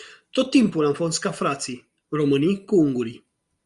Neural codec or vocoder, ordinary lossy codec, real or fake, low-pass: none; Opus, 64 kbps; real; 10.8 kHz